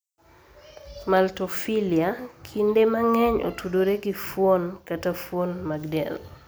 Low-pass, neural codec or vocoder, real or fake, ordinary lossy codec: none; none; real; none